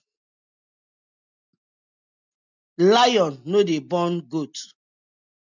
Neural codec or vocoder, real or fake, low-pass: none; real; 7.2 kHz